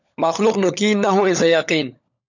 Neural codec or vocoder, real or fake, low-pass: codec, 16 kHz, 16 kbps, FunCodec, trained on LibriTTS, 50 frames a second; fake; 7.2 kHz